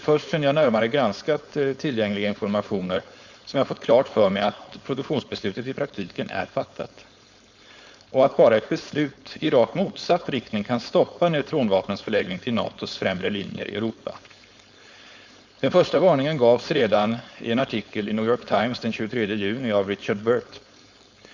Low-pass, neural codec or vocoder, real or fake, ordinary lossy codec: 7.2 kHz; codec, 16 kHz, 4.8 kbps, FACodec; fake; Opus, 64 kbps